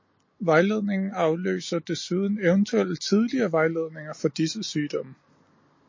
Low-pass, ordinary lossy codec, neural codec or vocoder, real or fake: 7.2 kHz; MP3, 32 kbps; none; real